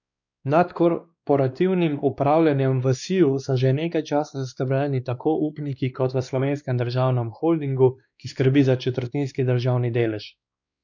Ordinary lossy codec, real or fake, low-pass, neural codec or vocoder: none; fake; 7.2 kHz; codec, 16 kHz, 2 kbps, X-Codec, WavLM features, trained on Multilingual LibriSpeech